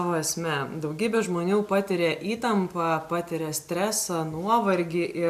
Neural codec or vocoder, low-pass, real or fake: none; 14.4 kHz; real